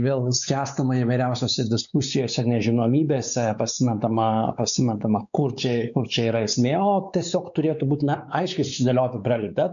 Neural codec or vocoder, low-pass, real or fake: codec, 16 kHz, 4 kbps, X-Codec, WavLM features, trained on Multilingual LibriSpeech; 7.2 kHz; fake